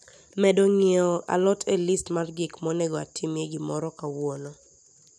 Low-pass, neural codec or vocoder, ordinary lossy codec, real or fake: none; none; none; real